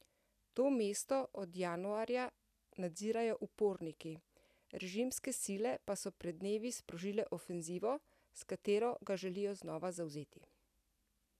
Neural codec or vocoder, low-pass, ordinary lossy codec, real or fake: none; 14.4 kHz; none; real